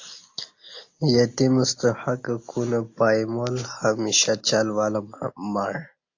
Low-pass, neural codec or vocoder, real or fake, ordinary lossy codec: 7.2 kHz; none; real; AAC, 48 kbps